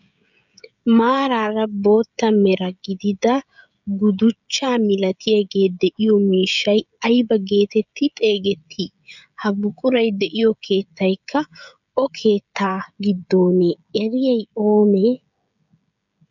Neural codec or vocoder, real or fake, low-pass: codec, 16 kHz, 16 kbps, FreqCodec, smaller model; fake; 7.2 kHz